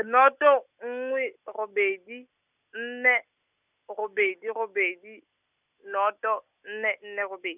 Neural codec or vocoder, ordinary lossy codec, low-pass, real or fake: none; none; 3.6 kHz; real